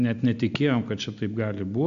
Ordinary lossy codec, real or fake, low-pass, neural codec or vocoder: AAC, 96 kbps; real; 7.2 kHz; none